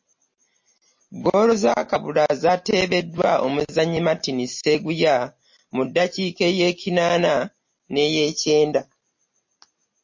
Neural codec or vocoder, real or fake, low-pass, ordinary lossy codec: none; real; 7.2 kHz; MP3, 32 kbps